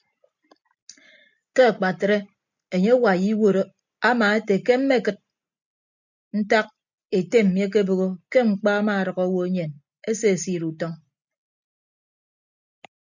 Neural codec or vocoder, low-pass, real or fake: none; 7.2 kHz; real